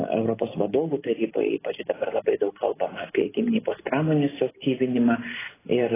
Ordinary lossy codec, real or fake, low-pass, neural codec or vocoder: AAC, 16 kbps; real; 3.6 kHz; none